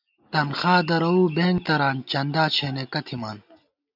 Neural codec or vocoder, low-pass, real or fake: none; 5.4 kHz; real